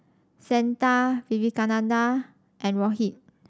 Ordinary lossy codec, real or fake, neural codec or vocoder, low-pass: none; real; none; none